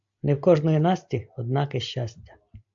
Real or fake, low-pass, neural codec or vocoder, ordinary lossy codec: real; 7.2 kHz; none; AAC, 64 kbps